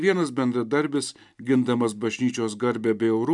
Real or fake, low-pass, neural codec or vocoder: real; 10.8 kHz; none